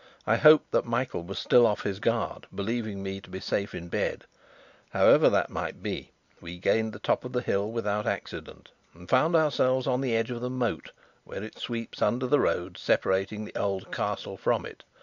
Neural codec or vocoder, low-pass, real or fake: none; 7.2 kHz; real